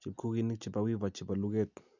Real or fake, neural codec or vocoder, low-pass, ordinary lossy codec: real; none; 7.2 kHz; none